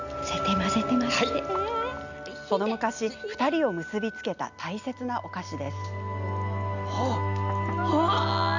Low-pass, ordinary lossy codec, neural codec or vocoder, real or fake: 7.2 kHz; none; none; real